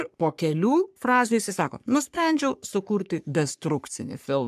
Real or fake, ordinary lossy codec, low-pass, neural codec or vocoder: fake; AAC, 96 kbps; 14.4 kHz; codec, 44.1 kHz, 3.4 kbps, Pupu-Codec